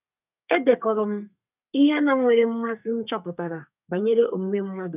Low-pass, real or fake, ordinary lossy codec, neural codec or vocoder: 3.6 kHz; fake; none; codec, 32 kHz, 1.9 kbps, SNAC